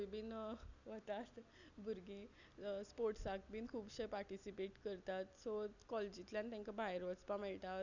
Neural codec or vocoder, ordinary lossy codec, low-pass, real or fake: none; none; 7.2 kHz; real